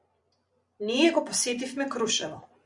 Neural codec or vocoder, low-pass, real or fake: vocoder, 44.1 kHz, 128 mel bands every 256 samples, BigVGAN v2; 10.8 kHz; fake